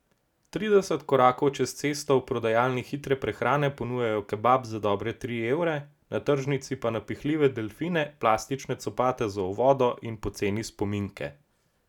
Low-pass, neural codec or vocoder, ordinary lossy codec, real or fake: 19.8 kHz; none; none; real